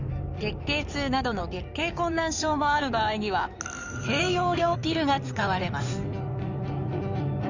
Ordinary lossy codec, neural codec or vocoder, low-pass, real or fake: none; codec, 16 kHz in and 24 kHz out, 2.2 kbps, FireRedTTS-2 codec; 7.2 kHz; fake